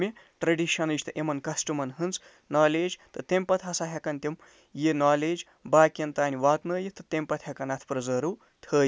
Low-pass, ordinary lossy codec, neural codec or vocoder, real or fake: none; none; none; real